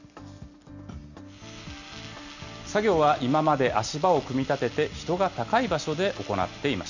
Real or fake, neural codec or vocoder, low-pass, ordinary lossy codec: real; none; 7.2 kHz; none